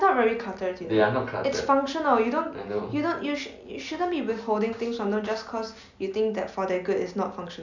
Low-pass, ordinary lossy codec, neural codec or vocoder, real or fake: 7.2 kHz; none; none; real